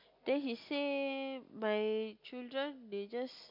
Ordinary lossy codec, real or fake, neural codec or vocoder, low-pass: MP3, 48 kbps; real; none; 5.4 kHz